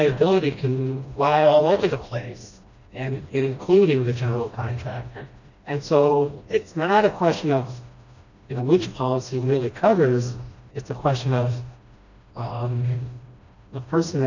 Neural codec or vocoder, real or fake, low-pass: codec, 16 kHz, 1 kbps, FreqCodec, smaller model; fake; 7.2 kHz